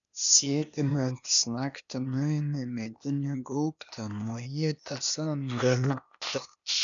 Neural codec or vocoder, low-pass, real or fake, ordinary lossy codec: codec, 16 kHz, 0.8 kbps, ZipCodec; 7.2 kHz; fake; MP3, 96 kbps